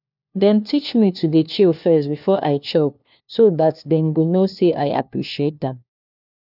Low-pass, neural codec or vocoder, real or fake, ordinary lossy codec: 5.4 kHz; codec, 16 kHz, 1 kbps, FunCodec, trained on LibriTTS, 50 frames a second; fake; none